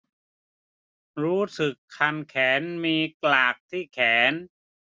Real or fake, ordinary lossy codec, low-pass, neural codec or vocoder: real; none; none; none